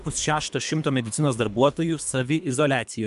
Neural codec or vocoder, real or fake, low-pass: codec, 24 kHz, 3 kbps, HILCodec; fake; 10.8 kHz